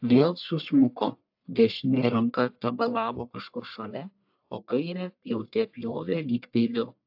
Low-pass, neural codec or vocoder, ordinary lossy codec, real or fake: 5.4 kHz; codec, 44.1 kHz, 1.7 kbps, Pupu-Codec; AAC, 48 kbps; fake